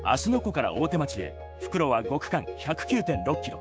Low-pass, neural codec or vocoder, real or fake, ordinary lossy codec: none; codec, 16 kHz, 6 kbps, DAC; fake; none